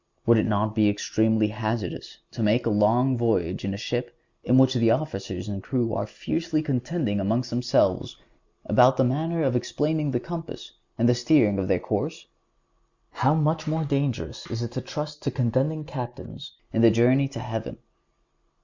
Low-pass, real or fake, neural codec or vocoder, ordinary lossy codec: 7.2 kHz; real; none; Opus, 64 kbps